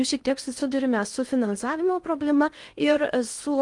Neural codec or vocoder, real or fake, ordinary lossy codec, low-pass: codec, 16 kHz in and 24 kHz out, 0.6 kbps, FocalCodec, streaming, 2048 codes; fake; Opus, 32 kbps; 10.8 kHz